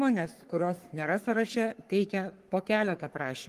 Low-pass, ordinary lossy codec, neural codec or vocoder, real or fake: 14.4 kHz; Opus, 24 kbps; codec, 44.1 kHz, 3.4 kbps, Pupu-Codec; fake